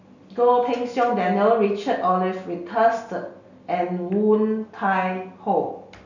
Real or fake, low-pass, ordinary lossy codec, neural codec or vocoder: real; 7.2 kHz; none; none